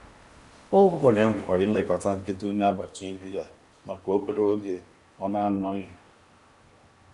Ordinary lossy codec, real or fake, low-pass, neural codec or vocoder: Opus, 64 kbps; fake; 10.8 kHz; codec, 16 kHz in and 24 kHz out, 0.8 kbps, FocalCodec, streaming, 65536 codes